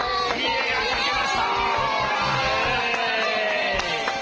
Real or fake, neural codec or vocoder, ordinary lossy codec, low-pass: real; none; Opus, 16 kbps; 7.2 kHz